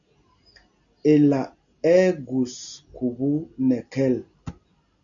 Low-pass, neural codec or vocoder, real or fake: 7.2 kHz; none; real